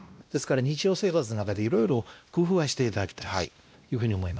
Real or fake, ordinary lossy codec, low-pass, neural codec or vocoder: fake; none; none; codec, 16 kHz, 1 kbps, X-Codec, WavLM features, trained on Multilingual LibriSpeech